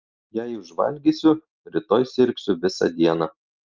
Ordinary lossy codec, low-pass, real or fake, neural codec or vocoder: Opus, 24 kbps; 7.2 kHz; real; none